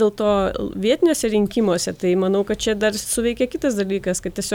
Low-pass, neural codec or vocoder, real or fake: 19.8 kHz; none; real